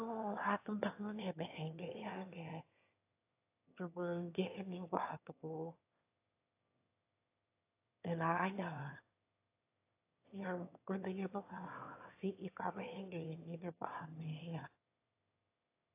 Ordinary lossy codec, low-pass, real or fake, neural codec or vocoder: AAC, 24 kbps; 3.6 kHz; fake; autoencoder, 22.05 kHz, a latent of 192 numbers a frame, VITS, trained on one speaker